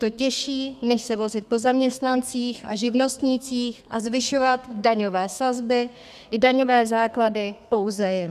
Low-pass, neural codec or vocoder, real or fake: 14.4 kHz; codec, 32 kHz, 1.9 kbps, SNAC; fake